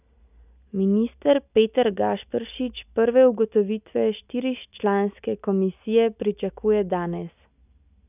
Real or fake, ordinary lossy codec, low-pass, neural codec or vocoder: real; none; 3.6 kHz; none